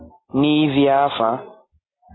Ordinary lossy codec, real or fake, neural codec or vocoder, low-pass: AAC, 16 kbps; real; none; 7.2 kHz